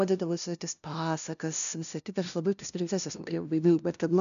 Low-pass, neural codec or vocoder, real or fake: 7.2 kHz; codec, 16 kHz, 0.5 kbps, FunCodec, trained on LibriTTS, 25 frames a second; fake